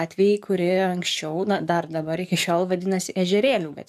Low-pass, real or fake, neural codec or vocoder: 14.4 kHz; fake; codec, 44.1 kHz, 7.8 kbps, DAC